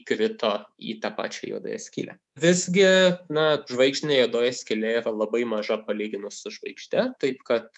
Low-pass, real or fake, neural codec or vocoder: 10.8 kHz; fake; codec, 24 kHz, 3.1 kbps, DualCodec